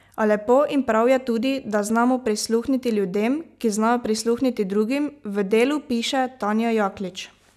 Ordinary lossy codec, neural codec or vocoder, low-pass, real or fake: none; none; 14.4 kHz; real